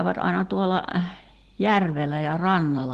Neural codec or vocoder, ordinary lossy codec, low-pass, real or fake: none; Opus, 16 kbps; 10.8 kHz; real